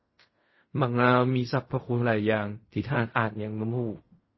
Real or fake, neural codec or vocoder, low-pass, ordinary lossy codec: fake; codec, 16 kHz in and 24 kHz out, 0.4 kbps, LongCat-Audio-Codec, fine tuned four codebook decoder; 7.2 kHz; MP3, 24 kbps